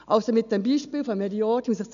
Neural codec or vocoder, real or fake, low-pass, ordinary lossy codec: none; real; 7.2 kHz; none